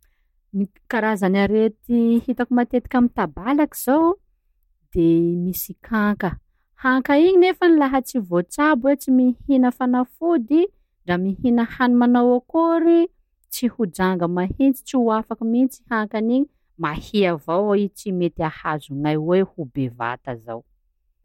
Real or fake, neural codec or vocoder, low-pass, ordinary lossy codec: real; none; 19.8 kHz; MP3, 64 kbps